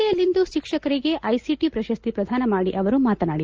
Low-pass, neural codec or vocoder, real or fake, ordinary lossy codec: 7.2 kHz; none; real; Opus, 24 kbps